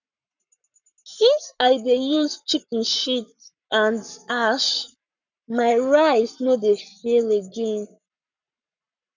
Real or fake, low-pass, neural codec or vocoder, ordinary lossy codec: fake; 7.2 kHz; codec, 44.1 kHz, 7.8 kbps, Pupu-Codec; none